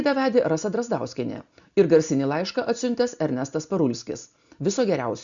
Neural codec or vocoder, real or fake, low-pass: none; real; 7.2 kHz